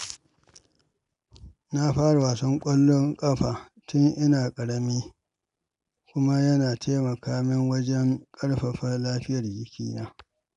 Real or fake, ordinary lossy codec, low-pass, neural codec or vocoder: real; none; 10.8 kHz; none